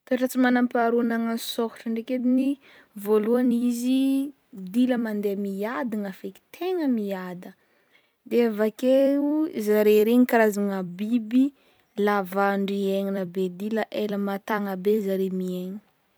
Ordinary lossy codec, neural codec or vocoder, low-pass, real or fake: none; vocoder, 44.1 kHz, 128 mel bands every 256 samples, BigVGAN v2; none; fake